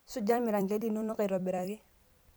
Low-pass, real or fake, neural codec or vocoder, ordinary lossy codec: none; fake; vocoder, 44.1 kHz, 128 mel bands every 256 samples, BigVGAN v2; none